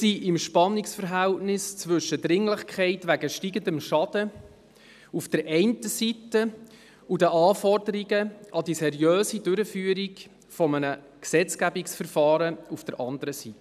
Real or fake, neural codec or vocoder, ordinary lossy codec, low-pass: real; none; none; 14.4 kHz